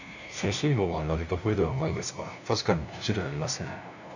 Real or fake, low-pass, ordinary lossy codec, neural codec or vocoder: fake; 7.2 kHz; none; codec, 16 kHz, 0.5 kbps, FunCodec, trained on LibriTTS, 25 frames a second